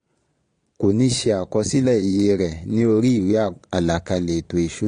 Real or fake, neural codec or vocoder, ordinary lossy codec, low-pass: fake; vocoder, 22.05 kHz, 80 mel bands, Vocos; AAC, 48 kbps; 9.9 kHz